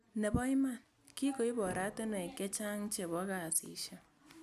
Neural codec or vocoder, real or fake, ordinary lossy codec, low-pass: none; real; none; 14.4 kHz